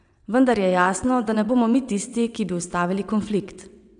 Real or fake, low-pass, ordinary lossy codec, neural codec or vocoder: fake; 9.9 kHz; none; vocoder, 22.05 kHz, 80 mel bands, WaveNeXt